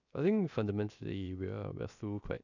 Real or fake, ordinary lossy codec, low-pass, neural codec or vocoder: fake; none; 7.2 kHz; codec, 16 kHz, 0.7 kbps, FocalCodec